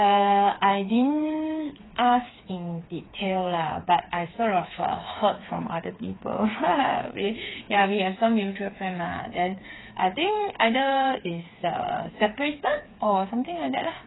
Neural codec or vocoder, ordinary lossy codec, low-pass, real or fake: codec, 16 kHz, 4 kbps, FreqCodec, smaller model; AAC, 16 kbps; 7.2 kHz; fake